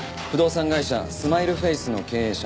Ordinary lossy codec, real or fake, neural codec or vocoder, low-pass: none; real; none; none